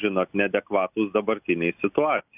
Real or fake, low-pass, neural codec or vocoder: real; 3.6 kHz; none